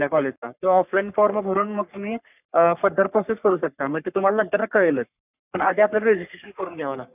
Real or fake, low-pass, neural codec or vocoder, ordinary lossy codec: fake; 3.6 kHz; codec, 44.1 kHz, 3.4 kbps, Pupu-Codec; none